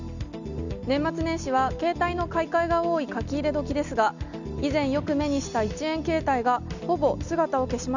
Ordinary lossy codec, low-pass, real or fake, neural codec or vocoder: none; 7.2 kHz; real; none